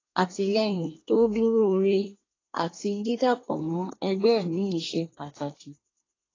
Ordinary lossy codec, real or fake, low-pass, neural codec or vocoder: AAC, 32 kbps; fake; 7.2 kHz; codec, 24 kHz, 1 kbps, SNAC